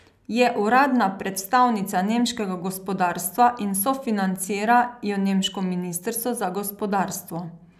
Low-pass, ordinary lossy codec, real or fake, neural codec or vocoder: 14.4 kHz; none; real; none